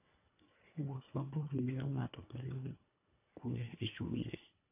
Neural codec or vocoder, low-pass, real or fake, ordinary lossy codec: codec, 24 kHz, 1.5 kbps, HILCodec; 3.6 kHz; fake; AAC, 24 kbps